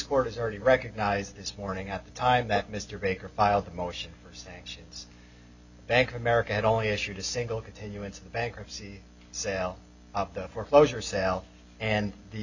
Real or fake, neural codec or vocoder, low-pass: real; none; 7.2 kHz